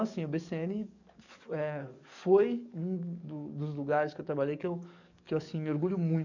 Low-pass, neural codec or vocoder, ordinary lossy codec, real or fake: 7.2 kHz; codec, 44.1 kHz, 7.8 kbps, DAC; none; fake